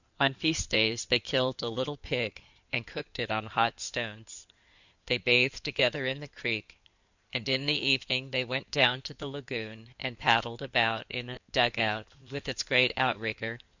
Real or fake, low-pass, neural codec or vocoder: fake; 7.2 kHz; codec, 16 kHz in and 24 kHz out, 2.2 kbps, FireRedTTS-2 codec